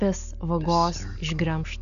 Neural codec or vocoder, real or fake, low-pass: none; real; 7.2 kHz